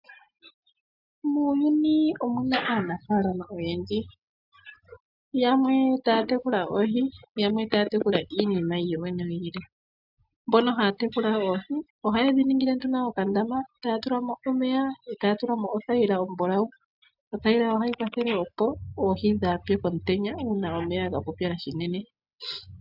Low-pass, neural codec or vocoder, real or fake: 5.4 kHz; none; real